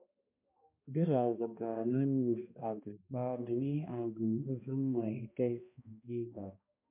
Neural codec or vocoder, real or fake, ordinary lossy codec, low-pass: codec, 16 kHz, 1 kbps, X-Codec, HuBERT features, trained on balanced general audio; fake; MP3, 32 kbps; 3.6 kHz